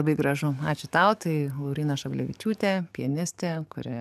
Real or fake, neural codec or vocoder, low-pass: fake; codec, 44.1 kHz, 7.8 kbps, DAC; 14.4 kHz